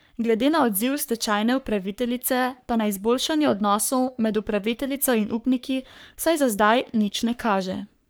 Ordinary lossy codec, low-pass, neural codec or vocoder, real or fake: none; none; codec, 44.1 kHz, 3.4 kbps, Pupu-Codec; fake